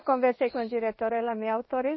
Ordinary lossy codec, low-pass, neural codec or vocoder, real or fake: MP3, 24 kbps; 7.2 kHz; codec, 16 kHz in and 24 kHz out, 1 kbps, XY-Tokenizer; fake